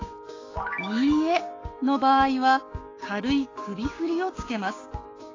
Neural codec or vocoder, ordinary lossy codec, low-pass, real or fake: codec, 16 kHz, 6 kbps, DAC; AAC, 32 kbps; 7.2 kHz; fake